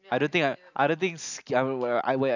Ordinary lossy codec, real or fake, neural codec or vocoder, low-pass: none; real; none; 7.2 kHz